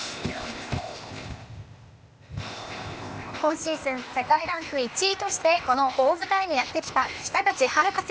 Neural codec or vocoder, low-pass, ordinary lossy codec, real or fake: codec, 16 kHz, 0.8 kbps, ZipCodec; none; none; fake